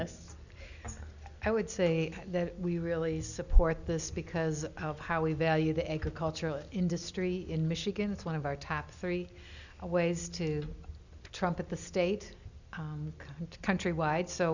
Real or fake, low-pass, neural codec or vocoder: real; 7.2 kHz; none